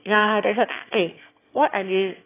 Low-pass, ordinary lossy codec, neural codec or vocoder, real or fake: 3.6 kHz; AAC, 16 kbps; autoencoder, 22.05 kHz, a latent of 192 numbers a frame, VITS, trained on one speaker; fake